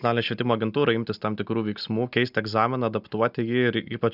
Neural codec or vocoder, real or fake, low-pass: none; real; 5.4 kHz